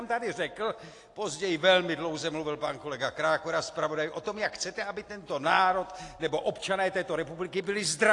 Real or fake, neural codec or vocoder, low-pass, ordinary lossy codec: real; none; 10.8 kHz; AAC, 48 kbps